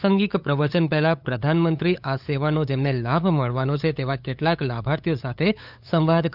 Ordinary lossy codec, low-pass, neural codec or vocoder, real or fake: none; 5.4 kHz; codec, 16 kHz, 8 kbps, FunCodec, trained on LibriTTS, 25 frames a second; fake